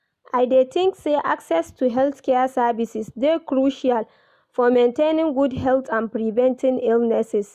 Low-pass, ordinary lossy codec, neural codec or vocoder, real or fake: 14.4 kHz; none; none; real